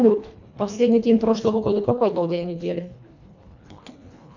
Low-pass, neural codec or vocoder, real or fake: 7.2 kHz; codec, 24 kHz, 1.5 kbps, HILCodec; fake